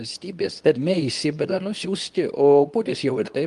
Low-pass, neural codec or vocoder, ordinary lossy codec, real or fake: 10.8 kHz; codec, 24 kHz, 0.9 kbps, WavTokenizer, medium speech release version 2; Opus, 32 kbps; fake